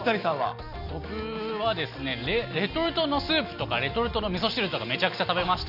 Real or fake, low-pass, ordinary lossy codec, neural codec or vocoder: real; 5.4 kHz; none; none